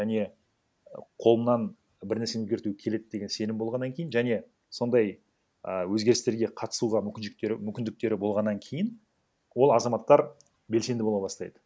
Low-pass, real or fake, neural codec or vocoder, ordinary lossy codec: none; real; none; none